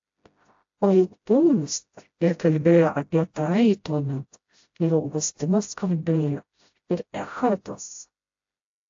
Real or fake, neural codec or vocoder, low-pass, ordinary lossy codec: fake; codec, 16 kHz, 0.5 kbps, FreqCodec, smaller model; 7.2 kHz; AAC, 48 kbps